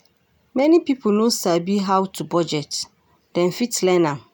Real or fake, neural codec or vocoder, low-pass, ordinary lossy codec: real; none; none; none